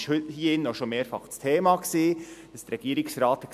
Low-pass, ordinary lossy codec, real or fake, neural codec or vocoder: 14.4 kHz; AAC, 96 kbps; real; none